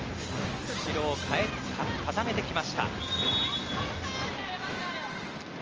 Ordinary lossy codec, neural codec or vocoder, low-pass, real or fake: Opus, 24 kbps; none; 7.2 kHz; real